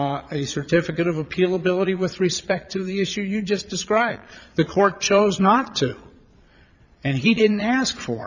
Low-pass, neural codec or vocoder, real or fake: 7.2 kHz; vocoder, 22.05 kHz, 80 mel bands, Vocos; fake